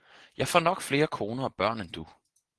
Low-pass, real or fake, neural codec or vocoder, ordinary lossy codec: 10.8 kHz; real; none; Opus, 16 kbps